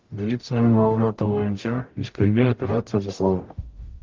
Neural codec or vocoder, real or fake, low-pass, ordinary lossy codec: codec, 44.1 kHz, 0.9 kbps, DAC; fake; 7.2 kHz; Opus, 24 kbps